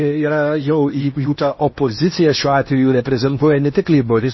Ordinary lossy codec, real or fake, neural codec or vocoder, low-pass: MP3, 24 kbps; fake; codec, 16 kHz in and 24 kHz out, 0.8 kbps, FocalCodec, streaming, 65536 codes; 7.2 kHz